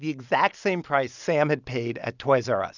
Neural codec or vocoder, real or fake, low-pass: none; real; 7.2 kHz